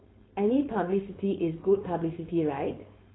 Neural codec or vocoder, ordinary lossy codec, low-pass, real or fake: codec, 16 kHz, 4.8 kbps, FACodec; AAC, 16 kbps; 7.2 kHz; fake